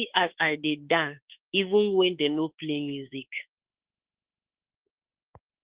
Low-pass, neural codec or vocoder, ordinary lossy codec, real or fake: 3.6 kHz; autoencoder, 48 kHz, 32 numbers a frame, DAC-VAE, trained on Japanese speech; Opus, 16 kbps; fake